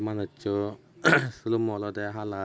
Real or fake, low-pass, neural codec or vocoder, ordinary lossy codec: real; none; none; none